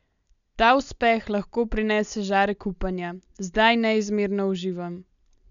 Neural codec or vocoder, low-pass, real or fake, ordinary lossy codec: none; 7.2 kHz; real; none